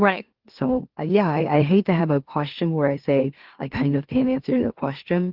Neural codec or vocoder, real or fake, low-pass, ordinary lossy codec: autoencoder, 44.1 kHz, a latent of 192 numbers a frame, MeloTTS; fake; 5.4 kHz; Opus, 16 kbps